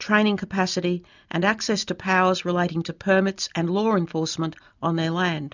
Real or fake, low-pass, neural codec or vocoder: real; 7.2 kHz; none